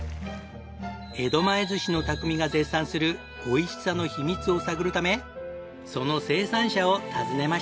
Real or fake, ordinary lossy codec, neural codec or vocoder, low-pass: real; none; none; none